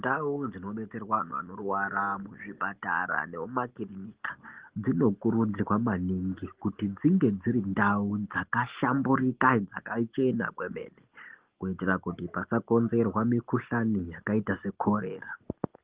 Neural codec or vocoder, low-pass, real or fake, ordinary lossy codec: none; 3.6 kHz; real; Opus, 16 kbps